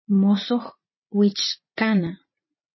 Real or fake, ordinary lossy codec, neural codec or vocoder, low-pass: fake; MP3, 24 kbps; vocoder, 44.1 kHz, 80 mel bands, Vocos; 7.2 kHz